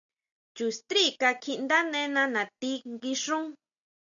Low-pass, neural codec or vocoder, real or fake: 7.2 kHz; none; real